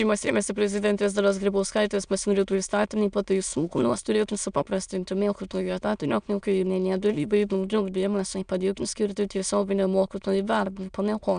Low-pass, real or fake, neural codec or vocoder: 9.9 kHz; fake; autoencoder, 22.05 kHz, a latent of 192 numbers a frame, VITS, trained on many speakers